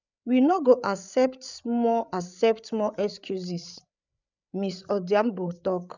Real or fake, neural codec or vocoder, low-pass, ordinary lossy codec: fake; codec, 16 kHz, 8 kbps, FreqCodec, larger model; 7.2 kHz; none